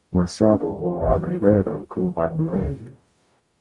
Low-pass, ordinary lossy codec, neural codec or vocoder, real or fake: 10.8 kHz; Opus, 64 kbps; codec, 44.1 kHz, 0.9 kbps, DAC; fake